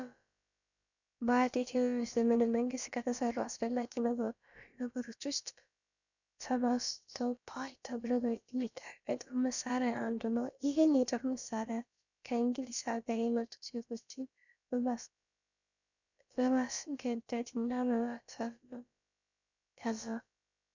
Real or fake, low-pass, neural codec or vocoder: fake; 7.2 kHz; codec, 16 kHz, about 1 kbps, DyCAST, with the encoder's durations